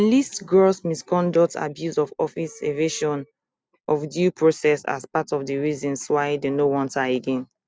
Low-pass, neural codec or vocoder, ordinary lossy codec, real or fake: none; none; none; real